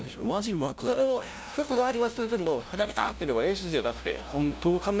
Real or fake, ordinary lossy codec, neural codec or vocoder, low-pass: fake; none; codec, 16 kHz, 0.5 kbps, FunCodec, trained on LibriTTS, 25 frames a second; none